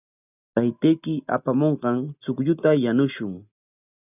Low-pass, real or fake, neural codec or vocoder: 3.6 kHz; real; none